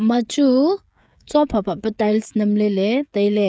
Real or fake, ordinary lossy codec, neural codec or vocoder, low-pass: fake; none; codec, 16 kHz, 16 kbps, FreqCodec, smaller model; none